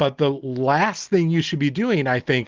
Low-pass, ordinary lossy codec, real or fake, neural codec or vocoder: 7.2 kHz; Opus, 16 kbps; real; none